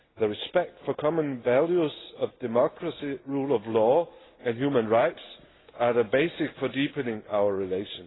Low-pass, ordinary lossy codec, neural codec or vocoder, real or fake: 7.2 kHz; AAC, 16 kbps; none; real